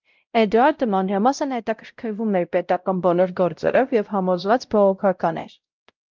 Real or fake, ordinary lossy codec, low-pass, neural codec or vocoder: fake; Opus, 24 kbps; 7.2 kHz; codec, 16 kHz, 0.5 kbps, X-Codec, WavLM features, trained on Multilingual LibriSpeech